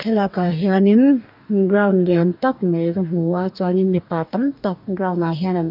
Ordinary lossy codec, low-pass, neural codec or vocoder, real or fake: none; 5.4 kHz; codec, 44.1 kHz, 2.6 kbps, DAC; fake